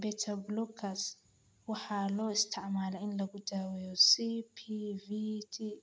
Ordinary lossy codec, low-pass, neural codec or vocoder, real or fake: none; none; none; real